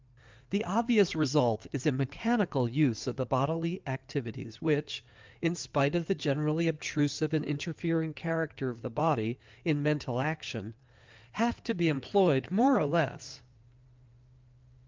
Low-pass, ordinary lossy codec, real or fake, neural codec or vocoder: 7.2 kHz; Opus, 24 kbps; fake; codec, 16 kHz in and 24 kHz out, 2.2 kbps, FireRedTTS-2 codec